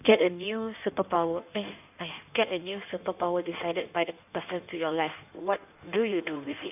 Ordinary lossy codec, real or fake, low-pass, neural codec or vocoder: none; fake; 3.6 kHz; codec, 16 kHz in and 24 kHz out, 1.1 kbps, FireRedTTS-2 codec